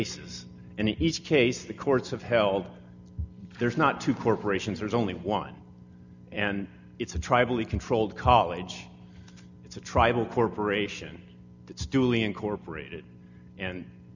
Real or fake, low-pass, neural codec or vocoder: real; 7.2 kHz; none